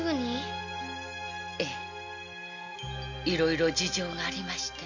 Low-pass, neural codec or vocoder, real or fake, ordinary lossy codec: 7.2 kHz; none; real; none